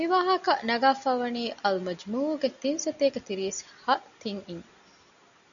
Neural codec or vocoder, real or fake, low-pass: none; real; 7.2 kHz